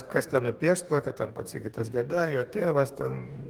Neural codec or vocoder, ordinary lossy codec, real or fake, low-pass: codec, 44.1 kHz, 2.6 kbps, DAC; Opus, 32 kbps; fake; 19.8 kHz